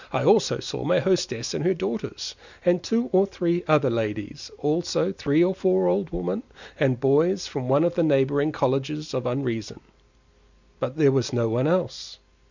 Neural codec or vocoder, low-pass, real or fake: none; 7.2 kHz; real